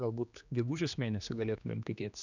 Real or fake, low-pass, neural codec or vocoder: fake; 7.2 kHz; codec, 16 kHz, 2 kbps, X-Codec, HuBERT features, trained on general audio